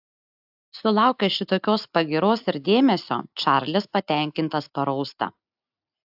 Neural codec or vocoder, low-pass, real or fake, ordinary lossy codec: none; 5.4 kHz; real; AAC, 48 kbps